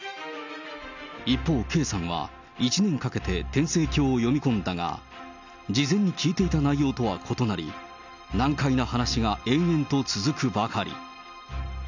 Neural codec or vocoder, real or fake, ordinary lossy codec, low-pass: none; real; none; 7.2 kHz